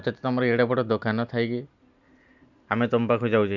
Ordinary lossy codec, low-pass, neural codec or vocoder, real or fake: none; 7.2 kHz; none; real